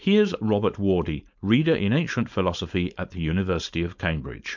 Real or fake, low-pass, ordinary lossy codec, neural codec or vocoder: fake; 7.2 kHz; MP3, 64 kbps; codec, 16 kHz, 4.8 kbps, FACodec